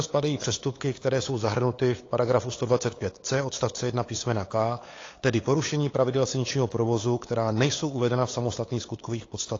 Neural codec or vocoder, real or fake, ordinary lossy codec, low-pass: codec, 16 kHz, 8 kbps, FunCodec, trained on LibriTTS, 25 frames a second; fake; AAC, 32 kbps; 7.2 kHz